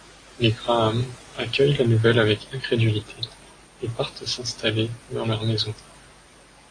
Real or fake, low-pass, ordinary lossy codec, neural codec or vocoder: real; 9.9 kHz; AAC, 32 kbps; none